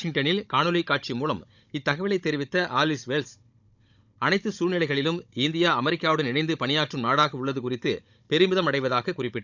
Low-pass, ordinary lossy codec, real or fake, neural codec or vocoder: 7.2 kHz; none; fake; codec, 16 kHz, 16 kbps, FunCodec, trained on Chinese and English, 50 frames a second